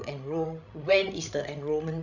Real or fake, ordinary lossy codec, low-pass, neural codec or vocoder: fake; none; 7.2 kHz; codec, 16 kHz, 16 kbps, FreqCodec, larger model